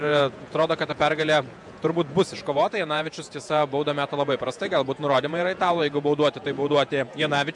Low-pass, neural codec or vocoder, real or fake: 10.8 kHz; vocoder, 48 kHz, 128 mel bands, Vocos; fake